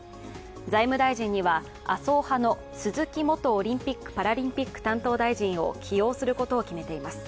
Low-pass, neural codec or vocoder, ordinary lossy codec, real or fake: none; none; none; real